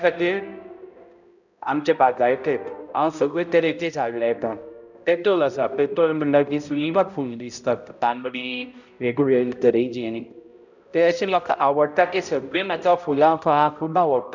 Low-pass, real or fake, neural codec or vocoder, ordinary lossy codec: 7.2 kHz; fake; codec, 16 kHz, 0.5 kbps, X-Codec, HuBERT features, trained on balanced general audio; none